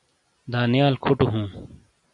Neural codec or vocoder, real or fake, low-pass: none; real; 10.8 kHz